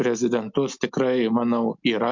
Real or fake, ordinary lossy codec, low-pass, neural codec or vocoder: real; MP3, 64 kbps; 7.2 kHz; none